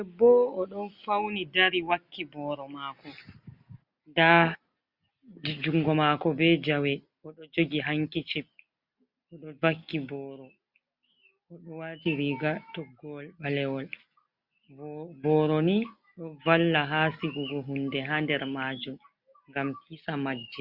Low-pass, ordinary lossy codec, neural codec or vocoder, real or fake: 5.4 kHz; Opus, 64 kbps; none; real